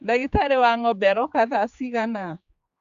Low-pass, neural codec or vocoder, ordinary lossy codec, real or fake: 7.2 kHz; codec, 16 kHz, 4 kbps, X-Codec, HuBERT features, trained on general audio; Opus, 64 kbps; fake